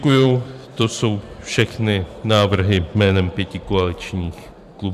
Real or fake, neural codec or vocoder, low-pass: fake; vocoder, 44.1 kHz, 128 mel bands every 512 samples, BigVGAN v2; 14.4 kHz